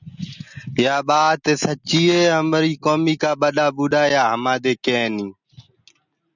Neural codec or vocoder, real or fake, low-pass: none; real; 7.2 kHz